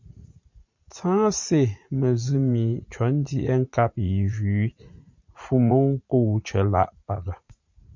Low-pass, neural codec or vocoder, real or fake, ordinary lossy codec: 7.2 kHz; vocoder, 44.1 kHz, 80 mel bands, Vocos; fake; MP3, 64 kbps